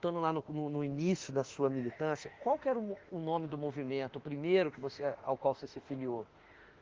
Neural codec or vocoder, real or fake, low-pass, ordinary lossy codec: autoencoder, 48 kHz, 32 numbers a frame, DAC-VAE, trained on Japanese speech; fake; 7.2 kHz; Opus, 16 kbps